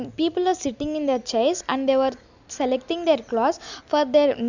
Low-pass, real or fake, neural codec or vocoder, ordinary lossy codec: 7.2 kHz; real; none; none